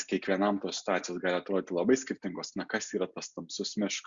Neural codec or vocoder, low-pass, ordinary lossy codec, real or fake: none; 7.2 kHz; Opus, 64 kbps; real